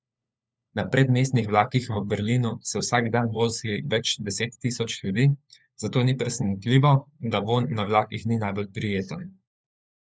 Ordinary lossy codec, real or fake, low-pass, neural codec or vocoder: none; fake; none; codec, 16 kHz, 4 kbps, FunCodec, trained on LibriTTS, 50 frames a second